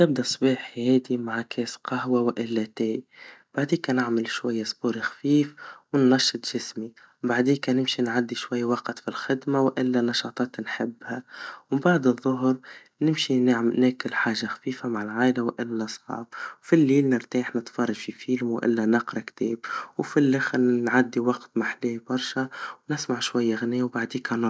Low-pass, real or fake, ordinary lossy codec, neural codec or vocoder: none; real; none; none